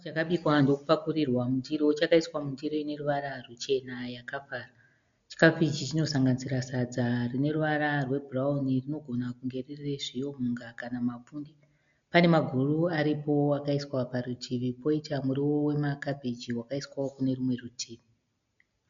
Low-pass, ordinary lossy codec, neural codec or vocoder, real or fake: 7.2 kHz; MP3, 64 kbps; none; real